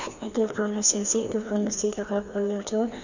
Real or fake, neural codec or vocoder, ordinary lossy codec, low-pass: fake; codec, 16 kHz, 2 kbps, FreqCodec, larger model; none; 7.2 kHz